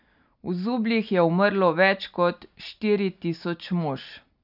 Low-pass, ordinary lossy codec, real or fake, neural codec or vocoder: 5.4 kHz; none; real; none